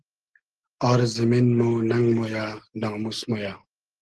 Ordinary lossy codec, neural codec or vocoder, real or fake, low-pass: Opus, 16 kbps; none; real; 10.8 kHz